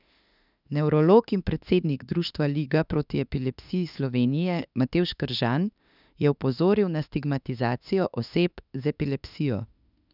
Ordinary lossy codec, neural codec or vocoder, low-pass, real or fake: none; autoencoder, 48 kHz, 32 numbers a frame, DAC-VAE, trained on Japanese speech; 5.4 kHz; fake